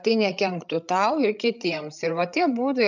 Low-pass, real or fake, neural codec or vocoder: 7.2 kHz; fake; codec, 16 kHz, 8 kbps, FreqCodec, larger model